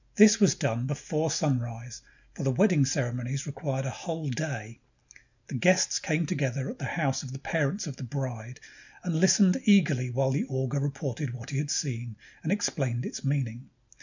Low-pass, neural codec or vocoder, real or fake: 7.2 kHz; none; real